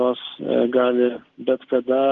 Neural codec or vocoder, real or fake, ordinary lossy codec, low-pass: none; real; Opus, 16 kbps; 7.2 kHz